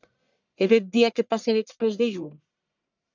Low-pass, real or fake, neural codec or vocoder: 7.2 kHz; fake; codec, 44.1 kHz, 1.7 kbps, Pupu-Codec